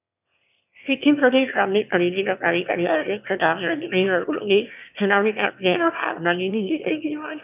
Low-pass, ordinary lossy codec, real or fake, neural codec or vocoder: 3.6 kHz; none; fake; autoencoder, 22.05 kHz, a latent of 192 numbers a frame, VITS, trained on one speaker